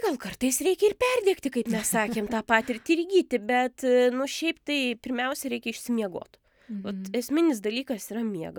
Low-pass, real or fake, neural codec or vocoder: 19.8 kHz; real; none